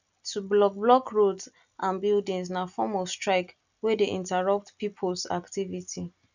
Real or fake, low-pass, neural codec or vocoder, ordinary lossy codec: real; 7.2 kHz; none; none